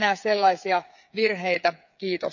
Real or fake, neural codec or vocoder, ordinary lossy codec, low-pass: fake; codec, 16 kHz, 8 kbps, FreqCodec, smaller model; none; 7.2 kHz